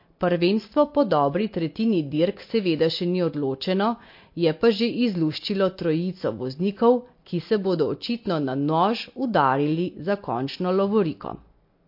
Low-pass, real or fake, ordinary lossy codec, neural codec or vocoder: 5.4 kHz; real; MP3, 32 kbps; none